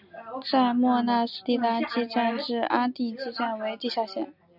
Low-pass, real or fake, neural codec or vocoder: 5.4 kHz; real; none